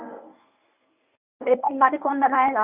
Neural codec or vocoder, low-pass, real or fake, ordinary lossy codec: codec, 24 kHz, 0.9 kbps, WavTokenizer, medium speech release version 1; 3.6 kHz; fake; none